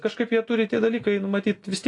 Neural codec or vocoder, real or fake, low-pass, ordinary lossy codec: none; real; 10.8 kHz; AAC, 48 kbps